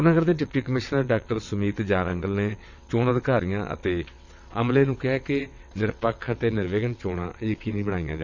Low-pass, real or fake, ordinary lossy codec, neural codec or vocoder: 7.2 kHz; fake; none; vocoder, 22.05 kHz, 80 mel bands, WaveNeXt